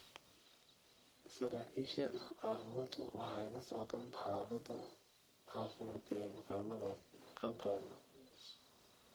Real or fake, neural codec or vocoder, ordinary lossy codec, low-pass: fake; codec, 44.1 kHz, 1.7 kbps, Pupu-Codec; none; none